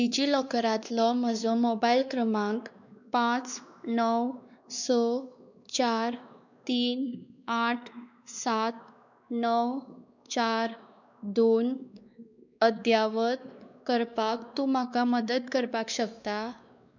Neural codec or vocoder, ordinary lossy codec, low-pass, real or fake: codec, 16 kHz, 2 kbps, X-Codec, WavLM features, trained on Multilingual LibriSpeech; none; 7.2 kHz; fake